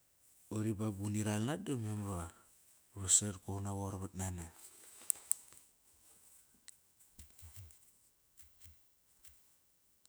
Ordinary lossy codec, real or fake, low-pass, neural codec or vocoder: none; fake; none; autoencoder, 48 kHz, 128 numbers a frame, DAC-VAE, trained on Japanese speech